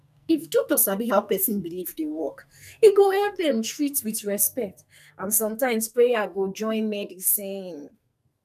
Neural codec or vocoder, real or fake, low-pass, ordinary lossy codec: codec, 44.1 kHz, 2.6 kbps, SNAC; fake; 14.4 kHz; none